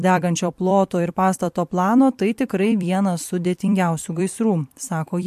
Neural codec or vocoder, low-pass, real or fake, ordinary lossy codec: vocoder, 44.1 kHz, 128 mel bands every 256 samples, BigVGAN v2; 14.4 kHz; fake; MP3, 64 kbps